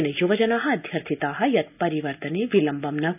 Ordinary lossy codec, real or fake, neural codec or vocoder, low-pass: none; real; none; 3.6 kHz